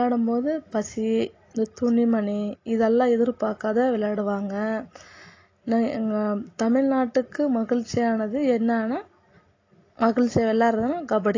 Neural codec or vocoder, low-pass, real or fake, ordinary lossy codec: none; 7.2 kHz; real; AAC, 32 kbps